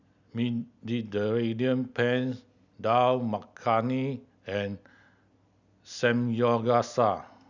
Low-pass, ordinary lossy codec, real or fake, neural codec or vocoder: 7.2 kHz; none; real; none